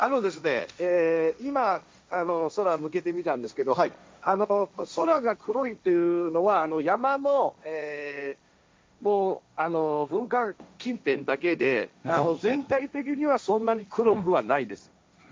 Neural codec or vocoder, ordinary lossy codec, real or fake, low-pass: codec, 16 kHz, 1.1 kbps, Voila-Tokenizer; none; fake; none